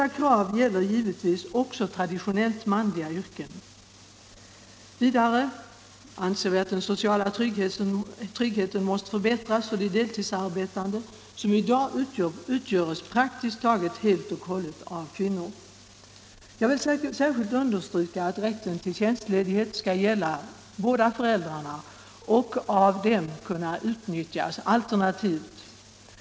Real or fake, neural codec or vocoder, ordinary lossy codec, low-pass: real; none; none; none